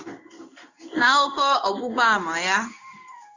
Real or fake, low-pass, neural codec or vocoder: fake; 7.2 kHz; codec, 16 kHz in and 24 kHz out, 1 kbps, XY-Tokenizer